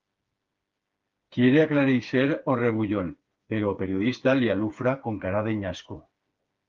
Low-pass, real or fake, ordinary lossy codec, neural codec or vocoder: 7.2 kHz; fake; Opus, 32 kbps; codec, 16 kHz, 4 kbps, FreqCodec, smaller model